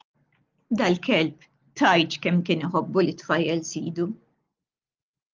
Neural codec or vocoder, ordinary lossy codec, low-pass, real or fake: none; Opus, 32 kbps; 7.2 kHz; real